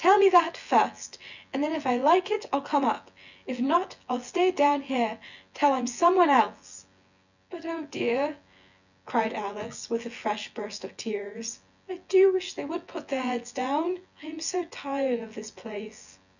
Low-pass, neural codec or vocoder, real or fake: 7.2 kHz; vocoder, 24 kHz, 100 mel bands, Vocos; fake